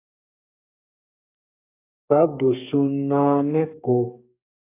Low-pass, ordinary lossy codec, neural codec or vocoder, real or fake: 3.6 kHz; AAC, 24 kbps; codec, 32 kHz, 1.9 kbps, SNAC; fake